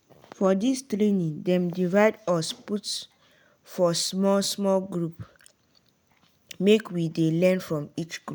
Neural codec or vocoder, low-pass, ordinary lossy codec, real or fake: none; none; none; real